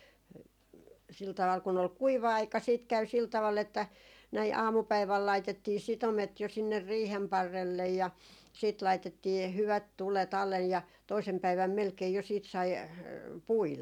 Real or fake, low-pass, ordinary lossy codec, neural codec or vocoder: real; 19.8 kHz; none; none